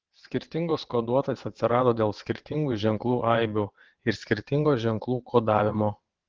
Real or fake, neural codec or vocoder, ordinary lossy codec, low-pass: fake; vocoder, 22.05 kHz, 80 mel bands, WaveNeXt; Opus, 16 kbps; 7.2 kHz